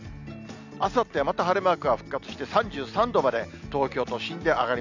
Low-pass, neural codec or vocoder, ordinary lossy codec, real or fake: 7.2 kHz; none; none; real